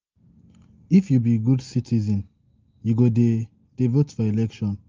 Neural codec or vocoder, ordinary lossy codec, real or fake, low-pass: none; Opus, 24 kbps; real; 7.2 kHz